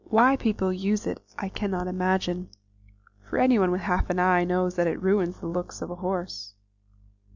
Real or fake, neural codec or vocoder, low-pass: real; none; 7.2 kHz